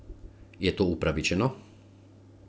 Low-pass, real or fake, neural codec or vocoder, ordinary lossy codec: none; real; none; none